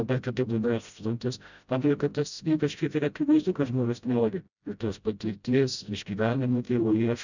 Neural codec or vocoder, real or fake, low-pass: codec, 16 kHz, 0.5 kbps, FreqCodec, smaller model; fake; 7.2 kHz